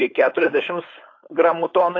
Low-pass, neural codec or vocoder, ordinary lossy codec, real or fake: 7.2 kHz; codec, 16 kHz, 4.8 kbps, FACodec; AAC, 32 kbps; fake